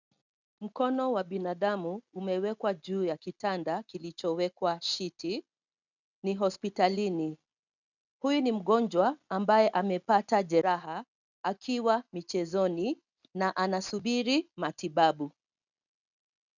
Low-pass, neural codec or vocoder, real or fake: 7.2 kHz; none; real